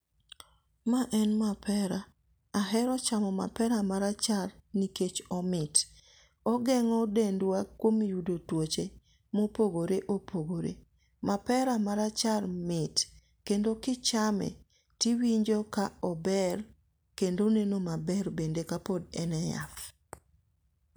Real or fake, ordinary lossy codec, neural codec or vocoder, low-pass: real; none; none; none